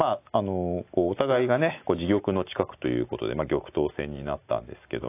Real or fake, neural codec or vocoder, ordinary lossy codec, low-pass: real; none; AAC, 24 kbps; 3.6 kHz